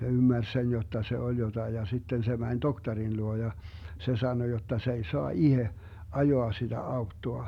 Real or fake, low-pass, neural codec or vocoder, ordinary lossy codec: real; 19.8 kHz; none; none